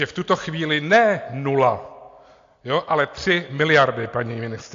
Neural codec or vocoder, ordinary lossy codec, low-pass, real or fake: none; AAC, 48 kbps; 7.2 kHz; real